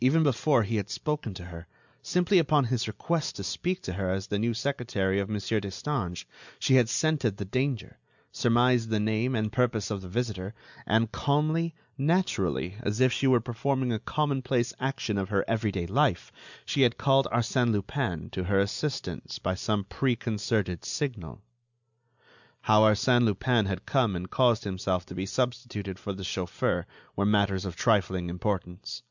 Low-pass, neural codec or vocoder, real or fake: 7.2 kHz; none; real